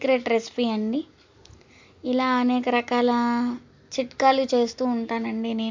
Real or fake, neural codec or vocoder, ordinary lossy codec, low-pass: real; none; MP3, 64 kbps; 7.2 kHz